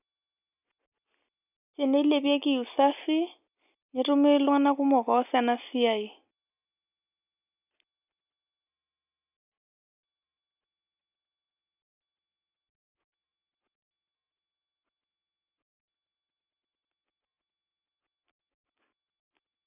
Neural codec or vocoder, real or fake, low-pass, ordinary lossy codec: none; real; 3.6 kHz; none